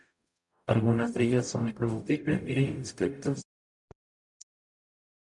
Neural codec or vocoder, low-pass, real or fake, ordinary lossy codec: codec, 44.1 kHz, 0.9 kbps, DAC; 10.8 kHz; fake; MP3, 96 kbps